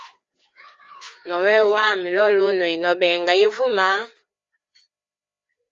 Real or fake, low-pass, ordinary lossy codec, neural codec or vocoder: fake; 7.2 kHz; Opus, 32 kbps; codec, 16 kHz, 2 kbps, FreqCodec, larger model